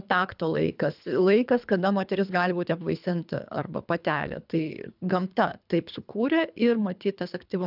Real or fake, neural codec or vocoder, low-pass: fake; codec, 24 kHz, 3 kbps, HILCodec; 5.4 kHz